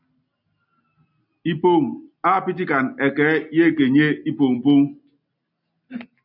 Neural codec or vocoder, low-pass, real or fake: none; 5.4 kHz; real